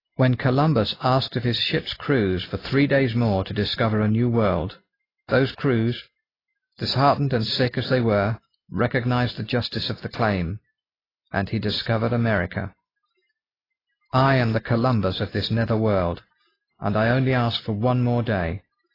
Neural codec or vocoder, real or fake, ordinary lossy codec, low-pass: none; real; AAC, 24 kbps; 5.4 kHz